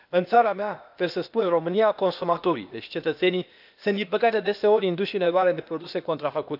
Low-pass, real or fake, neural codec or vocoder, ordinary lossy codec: 5.4 kHz; fake; codec, 16 kHz, 0.8 kbps, ZipCodec; none